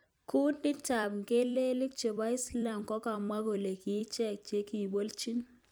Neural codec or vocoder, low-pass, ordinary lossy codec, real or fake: vocoder, 44.1 kHz, 128 mel bands every 256 samples, BigVGAN v2; none; none; fake